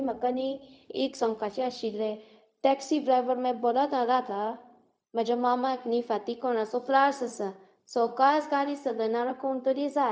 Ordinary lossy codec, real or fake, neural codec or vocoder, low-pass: none; fake; codec, 16 kHz, 0.4 kbps, LongCat-Audio-Codec; none